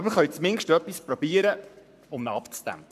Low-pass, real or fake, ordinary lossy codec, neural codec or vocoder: 14.4 kHz; fake; MP3, 96 kbps; codec, 44.1 kHz, 7.8 kbps, Pupu-Codec